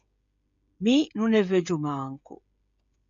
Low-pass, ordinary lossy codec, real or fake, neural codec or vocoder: 7.2 kHz; MP3, 64 kbps; fake; codec, 16 kHz, 16 kbps, FreqCodec, smaller model